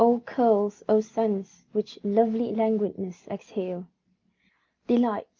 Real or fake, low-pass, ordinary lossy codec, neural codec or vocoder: real; 7.2 kHz; Opus, 32 kbps; none